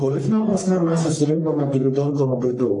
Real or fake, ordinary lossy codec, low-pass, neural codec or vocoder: fake; AAC, 48 kbps; 10.8 kHz; codec, 44.1 kHz, 1.7 kbps, Pupu-Codec